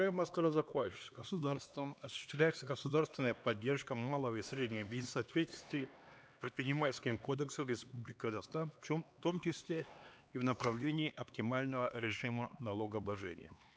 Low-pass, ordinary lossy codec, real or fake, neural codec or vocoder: none; none; fake; codec, 16 kHz, 2 kbps, X-Codec, HuBERT features, trained on LibriSpeech